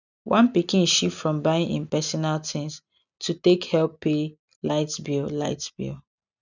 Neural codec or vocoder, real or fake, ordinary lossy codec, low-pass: none; real; none; 7.2 kHz